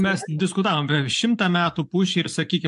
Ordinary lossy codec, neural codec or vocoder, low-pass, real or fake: AAC, 64 kbps; vocoder, 24 kHz, 100 mel bands, Vocos; 10.8 kHz; fake